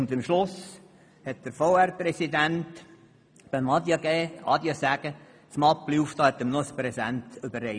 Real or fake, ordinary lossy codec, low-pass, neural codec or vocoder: real; none; 9.9 kHz; none